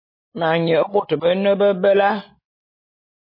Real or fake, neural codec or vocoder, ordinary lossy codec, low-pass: real; none; MP3, 24 kbps; 5.4 kHz